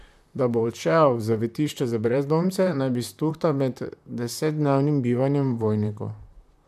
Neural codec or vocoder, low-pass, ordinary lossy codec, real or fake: vocoder, 44.1 kHz, 128 mel bands, Pupu-Vocoder; 14.4 kHz; MP3, 96 kbps; fake